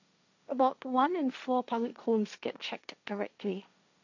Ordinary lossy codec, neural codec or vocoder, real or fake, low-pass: none; codec, 16 kHz, 1.1 kbps, Voila-Tokenizer; fake; 7.2 kHz